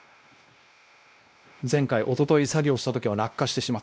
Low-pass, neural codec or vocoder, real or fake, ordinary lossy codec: none; codec, 16 kHz, 1 kbps, X-Codec, WavLM features, trained on Multilingual LibriSpeech; fake; none